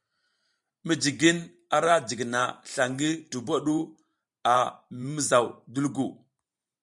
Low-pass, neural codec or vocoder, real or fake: 10.8 kHz; vocoder, 44.1 kHz, 128 mel bands every 512 samples, BigVGAN v2; fake